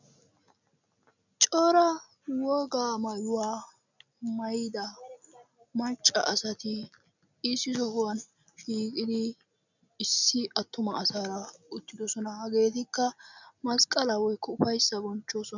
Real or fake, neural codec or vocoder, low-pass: real; none; 7.2 kHz